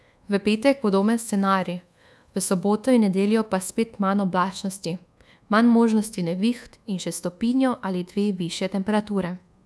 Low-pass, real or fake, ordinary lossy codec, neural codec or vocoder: none; fake; none; codec, 24 kHz, 1.2 kbps, DualCodec